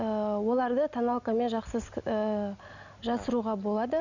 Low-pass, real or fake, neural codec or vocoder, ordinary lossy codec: 7.2 kHz; real; none; none